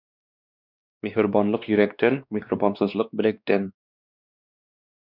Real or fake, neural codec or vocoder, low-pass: fake; codec, 16 kHz, 2 kbps, X-Codec, WavLM features, trained on Multilingual LibriSpeech; 5.4 kHz